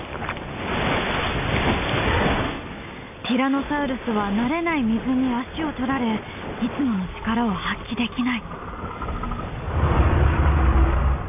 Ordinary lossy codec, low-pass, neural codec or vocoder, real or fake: none; 3.6 kHz; none; real